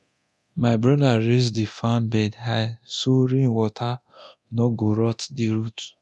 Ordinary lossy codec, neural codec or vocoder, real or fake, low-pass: none; codec, 24 kHz, 0.9 kbps, DualCodec; fake; none